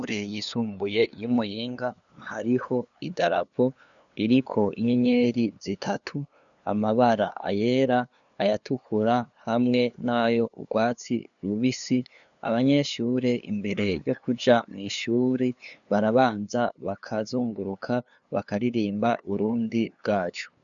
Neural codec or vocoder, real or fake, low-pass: codec, 16 kHz, 2 kbps, FunCodec, trained on LibriTTS, 25 frames a second; fake; 7.2 kHz